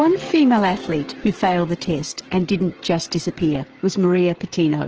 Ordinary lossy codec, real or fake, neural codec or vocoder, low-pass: Opus, 16 kbps; fake; codec, 16 kHz, 8 kbps, FreqCodec, smaller model; 7.2 kHz